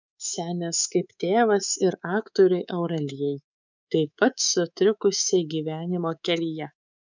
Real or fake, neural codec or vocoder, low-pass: fake; codec, 24 kHz, 3.1 kbps, DualCodec; 7.2 kHz